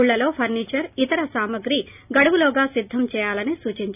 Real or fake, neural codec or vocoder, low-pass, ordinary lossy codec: real; none; 3.6 kHz; none